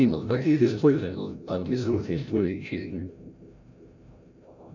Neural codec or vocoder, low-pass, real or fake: codec, 16 kHz, 0.5 kbps, FreqCodec, larger model; 7.2 kHz; fake